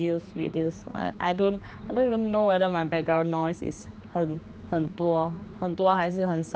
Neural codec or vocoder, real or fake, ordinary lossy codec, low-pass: codec, 16 kHz, 2 kbps, X-Codec, HuBERT features, trained on general audio; fake; none; none